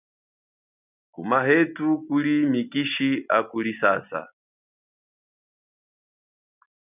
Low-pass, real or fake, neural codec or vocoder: 3.6 kHz; real; none